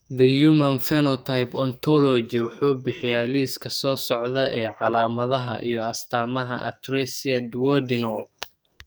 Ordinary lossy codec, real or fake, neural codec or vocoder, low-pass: none; fake; codec, 44.1 kHz, 2.6 kbps, SNAC; none